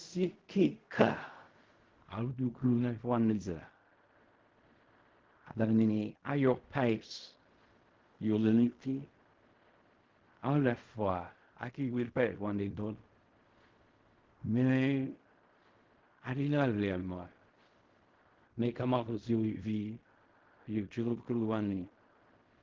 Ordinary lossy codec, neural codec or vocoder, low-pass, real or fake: Opus, 16 kbps; codec, 16 kHz in and 24 kHz out, 0.4 kbps, LongCat-Audio-Codec, fine tuned four codebook decoder; 7.2 kHz; fake